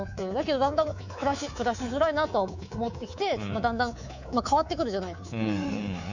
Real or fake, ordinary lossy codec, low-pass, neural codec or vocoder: fake; none; 7.2 kHz; codec, 24 kHz, 3.1 kbps, DualCodec